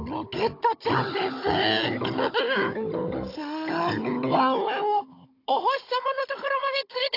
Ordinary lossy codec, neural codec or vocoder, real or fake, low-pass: AAC, 32 kbps; codec, 16 kHz, 16 kbps, FunCodec, trained on Chinese and English, 50 frames a second; fake; 5.4 kHz